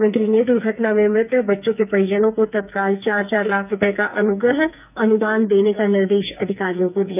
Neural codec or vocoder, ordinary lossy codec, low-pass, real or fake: codec, 44.1 kHz, 2.6 kbps, SNAC; none; 3.6 kHz; fake